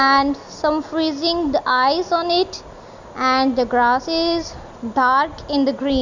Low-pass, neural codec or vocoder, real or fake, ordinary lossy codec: 7.2 kHz; none; real; Opus, 64 kbps